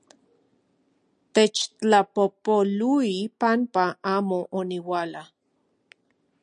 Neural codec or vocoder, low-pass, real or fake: none; 9.9 kHz; real